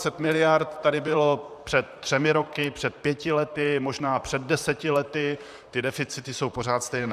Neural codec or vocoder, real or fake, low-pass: vocoder, 44.1 kHz, 128 mel bands, Pupu-Vocoder; fake; 14.4 kHz